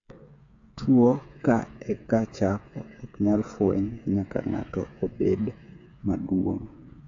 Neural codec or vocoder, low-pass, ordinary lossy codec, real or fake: codec, 16 kHz, 8 kbps, FreqCodec, smaller model; 7.2 kHz; AAC, 48 kbps; fake